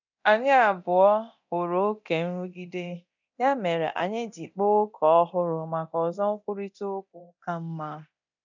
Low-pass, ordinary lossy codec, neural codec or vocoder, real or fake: 7.2 kHz; none; codec, 24 kHz, 0.9 kbps, DualCodec; fake